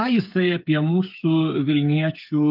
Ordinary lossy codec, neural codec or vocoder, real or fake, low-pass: Opus, 24 kbps; codec, 16 kHz, 8 kbps, FreqCodec, smaller model; fake; 5.4 kHz